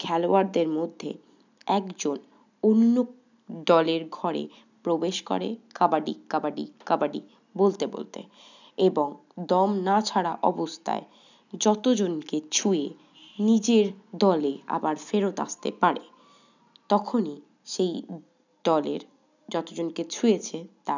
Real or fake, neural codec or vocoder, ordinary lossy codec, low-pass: real; none; none; 7.2 kHz